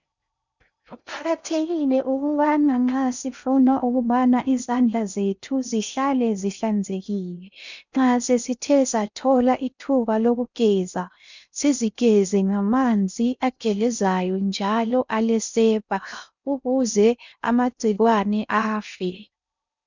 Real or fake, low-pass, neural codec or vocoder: fake; 7.2 kHz; codec, 16 kHz in and 24 kHz out, 0.6 kbps, FocalCodec, streaming, 4096 codes